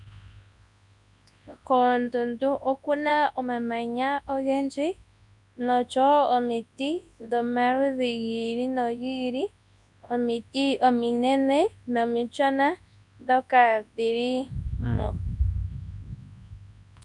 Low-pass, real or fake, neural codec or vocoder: 10.8 kHz; fake; codec, 24 kHz, 0.9 kbps, WavTokenizer, large speech release